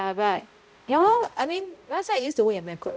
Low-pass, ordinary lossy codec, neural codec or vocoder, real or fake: none; none; codec, 16 kHz, 0.5 kbps, X-Codec, HuBERT features, trained on balanced general audio; fake